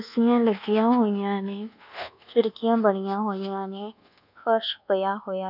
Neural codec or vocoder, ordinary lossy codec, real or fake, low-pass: codec, 24 kHz, 1.2 kbps, DualCodec; none; fake; 5.4 kHz